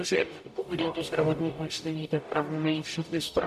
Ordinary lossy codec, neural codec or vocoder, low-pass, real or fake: AAC, 96 kbps; codec, 44.1 kHz, 0.9 kbps, DAC; 14.4 kHz; fake